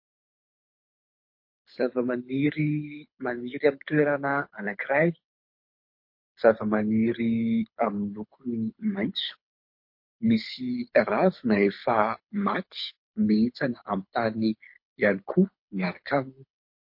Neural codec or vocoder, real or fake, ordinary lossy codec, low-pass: codec, 24 kHz, 6 kbps, HILCodec; fake; MP3, 32 kbps; 5.4 kHz